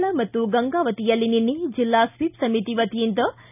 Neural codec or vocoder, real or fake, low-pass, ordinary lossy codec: none; real; 3.6 kHz; none